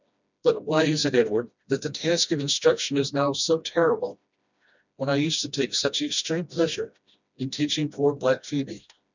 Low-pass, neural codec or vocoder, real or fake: 7.2 kHz; codec, 16 kHz, 1 kbps, FreqCodec, smaller model; fake